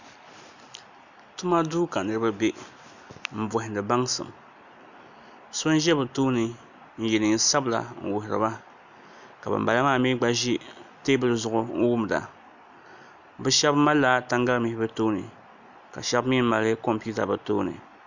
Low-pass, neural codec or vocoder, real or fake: 7.2 kHz; none; real